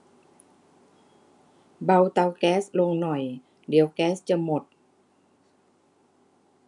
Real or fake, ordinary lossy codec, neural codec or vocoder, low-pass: real; none; none; 10.8 kHz